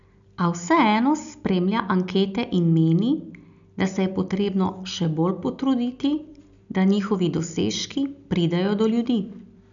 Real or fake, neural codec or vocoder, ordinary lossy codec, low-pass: real; none; none; 7.2 kHz